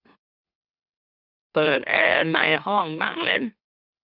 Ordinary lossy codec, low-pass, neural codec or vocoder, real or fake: none; 5.4 kHz; autoencoder, 44.1 kHz, a latent of 192 numbers a frame, MeloTTS; fake